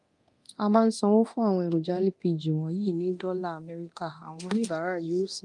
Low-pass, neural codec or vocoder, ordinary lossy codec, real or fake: 10.8 kHz; codec, 24 kHz, 0.9 kbps, DualCodec; Opus, 32 kbps; fake